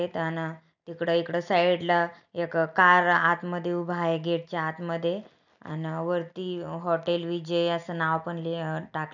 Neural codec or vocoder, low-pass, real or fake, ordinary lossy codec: none; 7.2 kHz; real; none